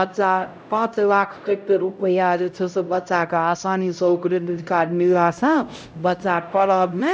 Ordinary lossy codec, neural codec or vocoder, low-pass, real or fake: none; codec, 16 kHz, 0.5 kbps, X-Codec, HuBERT features, trained on LibriSpeech; none; fake